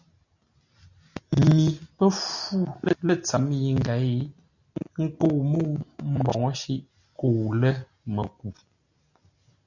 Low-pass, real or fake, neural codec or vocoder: 7.2 kHz; real; none